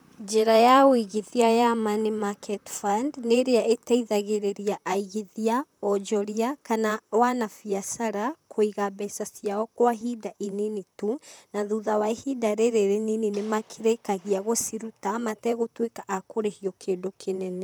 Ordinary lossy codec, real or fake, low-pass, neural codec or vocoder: none; fake; none; vocoder, 44.1 kHz, 128 mel bands, Pupu-Vocoder